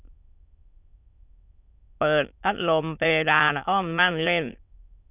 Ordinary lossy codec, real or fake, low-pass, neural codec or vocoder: none; fake; 3.6 kHz; autoencoder, 22.05 kHz, a latent of 192 numbers a frame, VITS, trained on many speakers